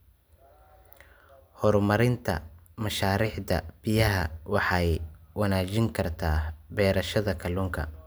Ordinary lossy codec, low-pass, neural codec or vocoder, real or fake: none; none; none; real